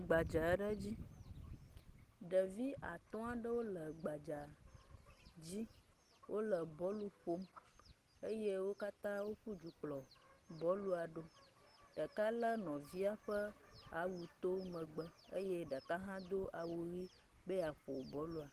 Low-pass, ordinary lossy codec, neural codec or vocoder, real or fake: 14.4 kHz; Opus, 16 kbps; none; real